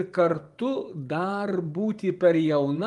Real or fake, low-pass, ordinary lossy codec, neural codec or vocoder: fake; 10.8 kHz; Opus, 32 kbps; vocoder, 24 kHz, 100 mel bands, Vocos